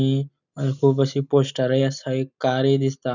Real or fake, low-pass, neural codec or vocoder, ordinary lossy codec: real; 7.2 kHz; none; none